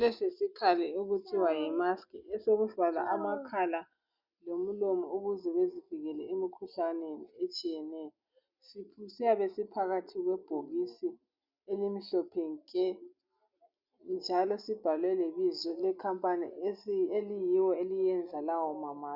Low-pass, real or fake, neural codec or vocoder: 5.4 kHz; real; none